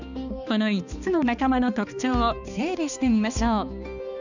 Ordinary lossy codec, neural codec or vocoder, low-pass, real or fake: none; codec, 16 kHz, 2 kbps, X-Codec, HuBERT features, trained on balanced general audio; 7.2 kHz; fake